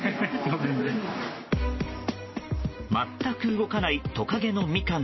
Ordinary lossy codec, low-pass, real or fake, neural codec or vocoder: MP3, 24 kbps; 7.2 kHz; real; none